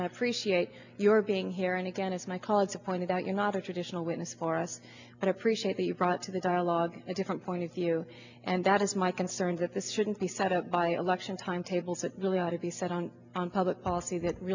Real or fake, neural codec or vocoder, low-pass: real; none; 7.2 kHz